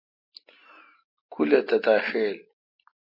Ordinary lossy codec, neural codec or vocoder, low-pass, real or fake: MP3, 32 kbps; none; 5.4 kHz; real